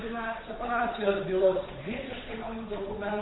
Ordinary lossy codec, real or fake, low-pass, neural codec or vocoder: AAC, 16 kbps; fake; 7.2 kHz; codec, 16 kHz, 8 kbps, FunCodec, trained on Chinese and English, 25 frames a second